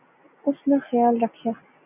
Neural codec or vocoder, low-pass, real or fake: none; 3.6 kHz; real